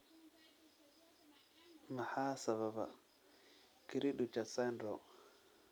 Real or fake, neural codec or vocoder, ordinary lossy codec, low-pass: real; none; none; none